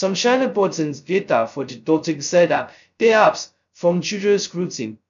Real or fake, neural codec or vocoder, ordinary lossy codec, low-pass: fake; codec, 16 kHz, 0.2 kbps, FocalCodec; MP3, 96 kbps; 7.2 kHz